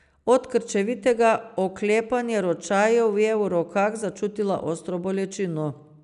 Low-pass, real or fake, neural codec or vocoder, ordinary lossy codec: 10.8 kHz; real; none; none